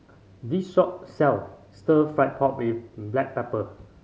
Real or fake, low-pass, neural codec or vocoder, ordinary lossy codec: real; none; none; none